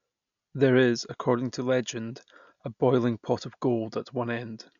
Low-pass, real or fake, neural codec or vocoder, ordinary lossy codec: 7.2 kHz; real; none; none